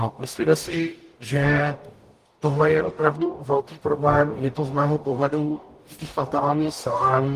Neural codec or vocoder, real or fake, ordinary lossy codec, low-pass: codec, 44.1 kHz, 0.9 kbps, DAC; fake; Opus, 24 kbps; 14.4 kHz